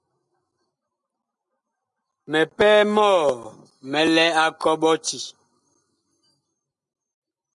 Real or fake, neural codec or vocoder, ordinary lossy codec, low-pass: real; none; MP3, 96 kbps; 10.8 kHz